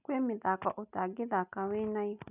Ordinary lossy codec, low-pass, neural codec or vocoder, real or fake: none; 3.6 kHz; none; real